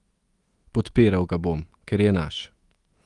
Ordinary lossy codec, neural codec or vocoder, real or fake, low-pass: Opus, 24 kbps; autoencoder, 48 kHz, 128 numbers a frame, DAC-VAE, trained on Japanese speech; fake; 10.8 kHz